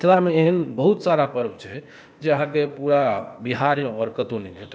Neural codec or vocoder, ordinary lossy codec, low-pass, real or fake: codec, 16 kHz, 0.8 kbps, ZipCodec; none; none; fake